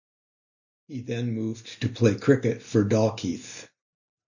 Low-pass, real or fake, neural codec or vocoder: 7.2 kHz; real; none